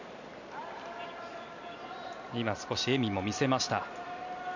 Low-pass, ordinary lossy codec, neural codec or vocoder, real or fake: 7.2 kHz; none; none; real